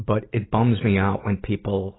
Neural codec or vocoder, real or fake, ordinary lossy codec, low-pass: none; real; AAC, 16 kbps; 7.2 kHz